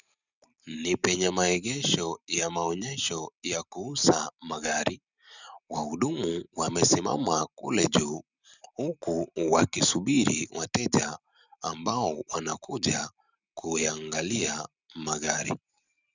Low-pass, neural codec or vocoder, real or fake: 7.2 kHz; none; real